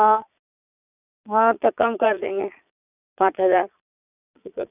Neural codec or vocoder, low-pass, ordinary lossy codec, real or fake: vocoder, 22.05 kHz, 80 mel bands, Vocos; 3.6 kHz; none; fake